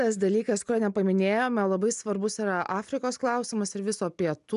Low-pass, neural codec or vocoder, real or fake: 10.8 kHz; none; real